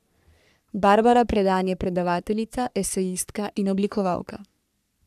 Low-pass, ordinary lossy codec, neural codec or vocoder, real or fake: 14.4 kHz; none; codec, 44.1 kHz, 3.4 kbps, Pupu-Codec; fake